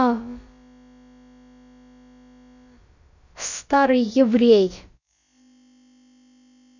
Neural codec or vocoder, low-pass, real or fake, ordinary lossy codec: codec, 16 kHz, about 1 kbps, DyCAST, with the encoder's durations; 7.2 kHz; fake; none